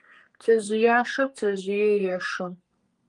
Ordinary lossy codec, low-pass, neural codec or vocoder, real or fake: Opus, 32 kbps; 10.8 kHz; codec, 32 kHz, 1.9 kbps, SNAC; fake